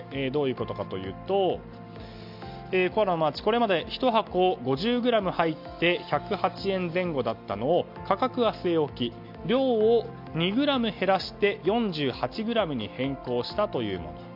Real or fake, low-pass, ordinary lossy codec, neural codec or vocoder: real; 5.4 kHz; MP3, 48 kbps; none